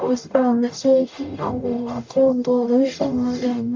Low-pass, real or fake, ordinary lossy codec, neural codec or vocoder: 7.2 kHz; fake; MP3, 48 kbps; codec, 44.1 kHz, 0.9 kbps, DAC